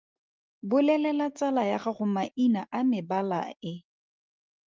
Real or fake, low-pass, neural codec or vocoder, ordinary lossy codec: real; 7.2 kHz; none; Opus, 32 kbps